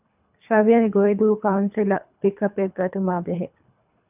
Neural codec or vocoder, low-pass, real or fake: codec, 24 kHz, 3 kbps, HILCodec; 3.6 kHz; fake